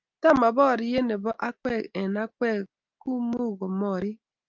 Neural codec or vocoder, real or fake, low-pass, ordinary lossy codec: none; real; 7.2 kHz; Opus, 24 kbps